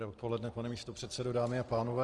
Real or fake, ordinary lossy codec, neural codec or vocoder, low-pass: real; Opus, 24 kbps; none; 10.8 kHz